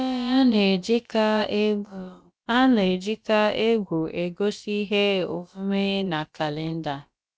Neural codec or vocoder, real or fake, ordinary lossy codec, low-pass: codec, 16 kHz, about 1 kbps, DyCAST, with the encoder's durations; fake; none; none